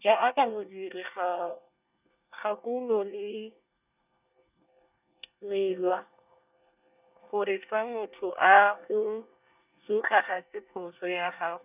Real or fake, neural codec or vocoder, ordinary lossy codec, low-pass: fake; codec, 24 kHz, 1 kbps, SNAC; none; 3.6 kHz